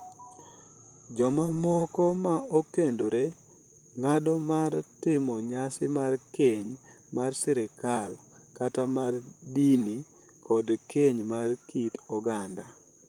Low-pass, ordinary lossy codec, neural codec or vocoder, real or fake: 19.8 kHz; Opus, 32 kbps; vocoder, 44.1 kHz, 128 mel bands, Pupu-Vocoder; fake